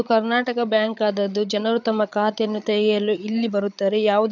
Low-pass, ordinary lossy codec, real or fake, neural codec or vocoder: 7.2 kHz; none; fake; codec, 16 kHz, 8 kbps, FreqCodec, larger model